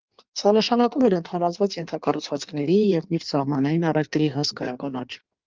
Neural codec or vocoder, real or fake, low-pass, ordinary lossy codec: codec, 16 kHz in and 24 kHz out, 1.1 kbps, FireRedTTS-2 codec; fake; 7.2 kHz; Opus, 24 kbps